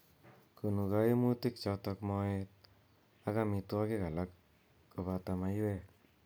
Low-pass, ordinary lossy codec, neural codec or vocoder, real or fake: none; none; none; real